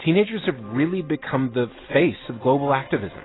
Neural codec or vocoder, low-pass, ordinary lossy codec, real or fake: none; 7.2 kHz; AAC, 16 kbps; real